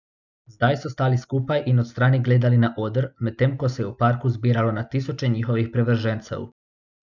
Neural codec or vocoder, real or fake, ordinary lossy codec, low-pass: none; real; none; 7.2 kHz